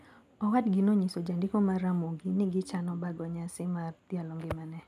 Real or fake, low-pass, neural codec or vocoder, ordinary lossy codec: real; 14.4 kHz; none; none